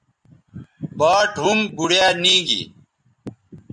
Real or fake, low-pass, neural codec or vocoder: real; 10.8 kHz; none